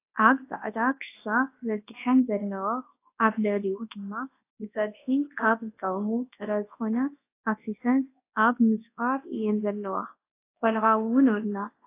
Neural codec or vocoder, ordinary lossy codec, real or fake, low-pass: codec, 24 kHz, 0.9 kbps, WavTokenizer, large speech release; AAC, 24 kbps; fake; 3.6 kHz